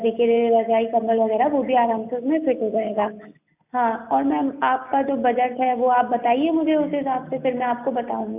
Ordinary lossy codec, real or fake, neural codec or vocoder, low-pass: AAC, 32 kbps; real; none; 3.6 kHz